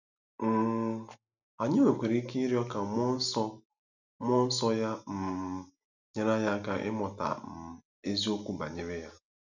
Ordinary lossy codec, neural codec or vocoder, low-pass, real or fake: none; none; 7.2 kHz; real